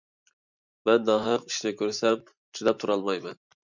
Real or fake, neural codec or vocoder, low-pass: fake; vocoder, 44.1 kHz, 128 mel bands every 512 samples, BigVGAN v2; 7.2 kHz